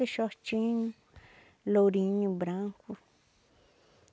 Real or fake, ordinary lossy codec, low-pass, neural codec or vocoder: real; none; none; none